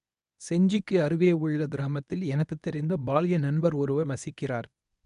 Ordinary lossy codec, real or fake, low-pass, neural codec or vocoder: none; fake; 10.8 kHz; codec, 24 kHz, 0.9 kbps, WavTokenizer, medium speech release version 1